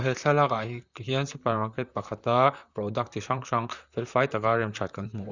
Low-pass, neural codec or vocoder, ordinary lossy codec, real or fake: 7.2 kHz; vocoder, 44.1 kHz, 128 mel bands, Pupu-Vocoder; Opus, 64 kbps; fake